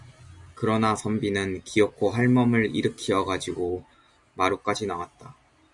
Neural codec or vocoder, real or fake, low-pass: none; real; 10.8 kHz